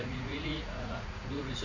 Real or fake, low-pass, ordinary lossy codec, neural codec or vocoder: fake; 7.2 kHz; none; vocoder, 44.1 kHz, 80 mel bands, Vocos